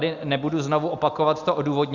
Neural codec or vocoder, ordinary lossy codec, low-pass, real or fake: none; Opus, 64 kbps; 7.2 kHz; real